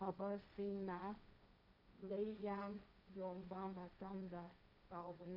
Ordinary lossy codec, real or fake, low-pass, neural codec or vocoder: none; fake; 5.4 kHz; codec, 16 kHz, 1.1 kbps, Voila-Tokenizer